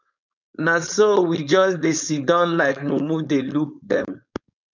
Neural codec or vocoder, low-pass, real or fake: codec, 16 kHz, 4.8 kbps, FACodec; 7.2 kHz; fake